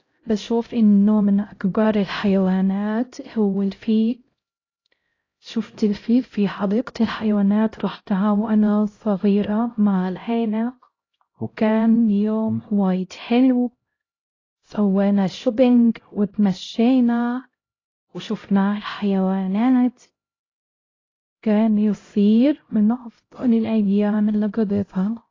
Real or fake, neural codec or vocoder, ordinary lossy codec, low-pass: fake; codec, 16 kHz, 0.5 kbps, X-Codec, HuBERT features, trained on LibriSpeech; AAC, 32 kbps; 7.2 kHz